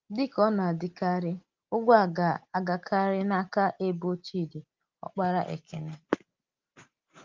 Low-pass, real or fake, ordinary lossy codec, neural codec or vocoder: 7.2 kHz; real; Opus, 32 kbps; none